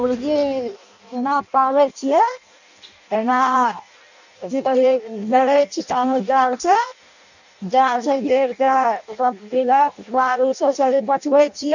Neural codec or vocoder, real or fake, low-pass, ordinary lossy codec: codec, 16 kHz in and 24 kHz out, 0.6 kbps, FireRedTTS-2 codec; fake; 7.2 kHz; none